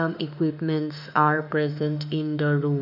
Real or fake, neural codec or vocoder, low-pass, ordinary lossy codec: fake; autoencoder, 48 kHz, 32 numbers a frame, DAC-VAE, trained on Japanese speech; 5.4 kHz; none